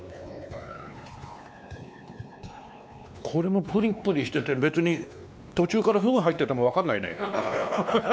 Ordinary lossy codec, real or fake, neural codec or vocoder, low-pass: none; fake; codec, 16 kHz, 2 kbps, X-Codec, WavLM features, trained on Multilingual LibriSpeech; none